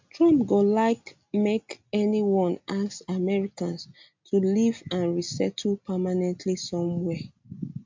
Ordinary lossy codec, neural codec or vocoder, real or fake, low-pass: MP3, 64 kbps; none; real; 7.2 kHz